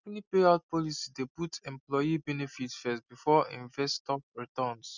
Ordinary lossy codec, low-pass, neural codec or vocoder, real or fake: none; 7.2 kHz; none; real